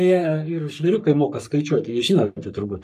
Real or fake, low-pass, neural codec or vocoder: fake; 14.4 kHz; codec, 44.1 kHz, 3.4 kbps, Pupu-Codec